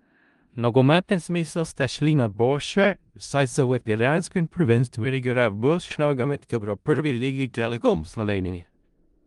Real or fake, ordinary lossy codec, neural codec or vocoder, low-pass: fake; Opus, 32 kbps; codec, 16 kHz in and 24 kHz out, 0.4 kbps, LongCat-Audio-Codec, four codebook decoder; 10.8 kHz